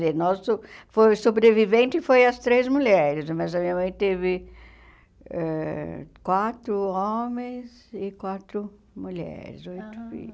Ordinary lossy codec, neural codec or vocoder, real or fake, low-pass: none; none; real; none